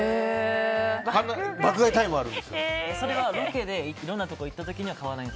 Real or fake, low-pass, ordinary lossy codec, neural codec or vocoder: real; none; none; none